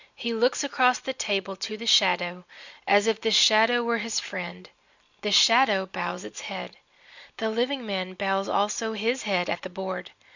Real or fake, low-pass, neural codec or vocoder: real; 7.2 kHz; none